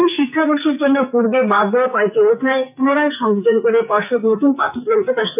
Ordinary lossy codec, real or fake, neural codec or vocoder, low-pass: none; fake; codec, 44.1 kHz, 2.6 kbps, SNAC; 3.6 kHz